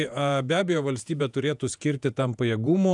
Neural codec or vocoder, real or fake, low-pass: vocoder, 48 kHz, 128 mel bands, Vocos; fake; 10.8 kHz